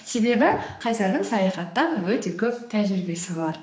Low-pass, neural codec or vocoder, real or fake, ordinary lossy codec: none; codec, 16 kHz, 2 kbps, X-Codec, HuBERT features, trained on general audio; fake; none